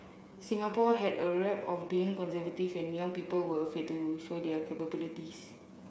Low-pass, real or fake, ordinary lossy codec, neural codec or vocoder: none; fake; none; codec, 16 kHz, 8 kbps, FreqCodec, smaller model